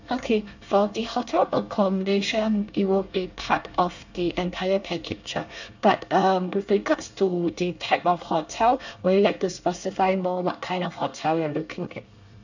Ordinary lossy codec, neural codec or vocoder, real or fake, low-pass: none; codec, 24 kHz, 1 kbps, SNAC; fake; 7.2 kHz